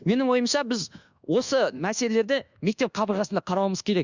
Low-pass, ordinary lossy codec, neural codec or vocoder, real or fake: 7.2 kHz; Opus, 64 kbps; codec, 24 kHz, 1.2 kbps, DualCodec; fake